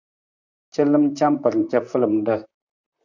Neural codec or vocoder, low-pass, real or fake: vocoder, 44.1 kHz, 128 mel bands, Pupu-Vocoder; 7.2 kHz; fake